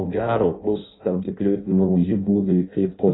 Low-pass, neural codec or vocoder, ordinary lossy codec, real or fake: 7.2 kHz; codec, 16 kHz in and 24 kHz out, 0.6 kbps, FireRedTTS-2 codec; AAC, 16 kbps; fake